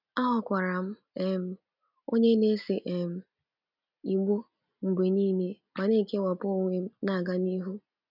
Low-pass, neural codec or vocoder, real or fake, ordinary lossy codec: 5.4 kHz; none; real; none